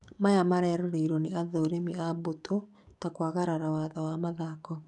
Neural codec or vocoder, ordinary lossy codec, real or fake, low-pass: codec, 44.1 kHz, 7.8 kbps, DAC; none; fake; 10.8 kHz